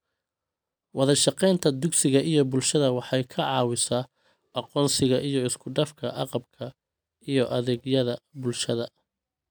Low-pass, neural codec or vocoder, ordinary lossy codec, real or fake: none; none; none; real